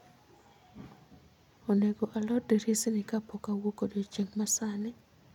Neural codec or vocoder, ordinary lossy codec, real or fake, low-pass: none; none; real; 19.8 kHz